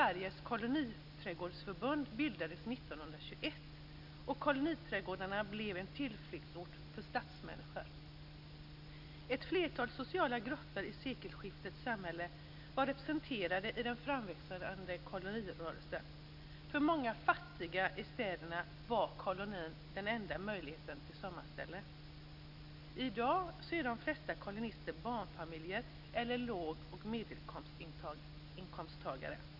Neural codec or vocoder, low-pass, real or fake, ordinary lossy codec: none; 5.4 kHz; real; none